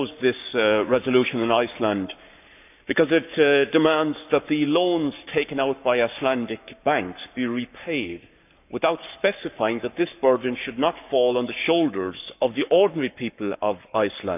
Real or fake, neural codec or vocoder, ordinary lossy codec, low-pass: fake; codec, 44.1 kHz, 7.8 kbps, Pupu-Codec; none; 3.6 kHz